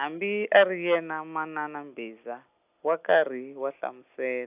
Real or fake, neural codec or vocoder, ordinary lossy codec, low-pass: real; none; AAC, 32 kbps; 3.6 kHz